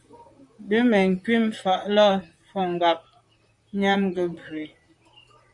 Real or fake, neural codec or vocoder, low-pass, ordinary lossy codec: fake; vocoder, 44.1 kHz, 128 mel bands, Pupu-Vocoder; 10.8 kHz; Opus, 64 kbps